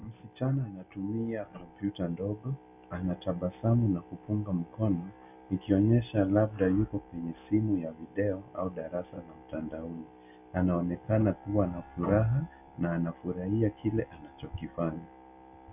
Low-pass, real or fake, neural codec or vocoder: 3.6 kHz; real; none